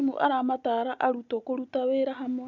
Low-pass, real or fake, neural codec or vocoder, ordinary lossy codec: 7.2 kHz; real; none; none